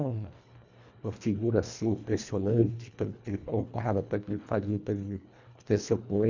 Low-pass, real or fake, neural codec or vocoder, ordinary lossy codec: 7.2 kHz; fake; codec, 24 kHz, 1.5 kbps, HILCodec; none